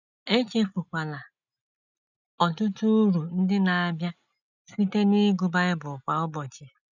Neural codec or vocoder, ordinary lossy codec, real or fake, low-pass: none; none; real; 7.2 kHz